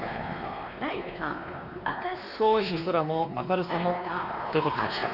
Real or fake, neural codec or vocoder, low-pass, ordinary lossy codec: fake; codec, 16 kHz, 2 kbps, X-Codec, WavLM features, trained on Multilingual LibriSpeech; 5.4 kHz; none